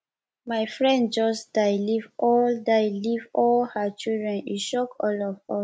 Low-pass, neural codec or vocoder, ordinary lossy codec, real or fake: none; none; none; real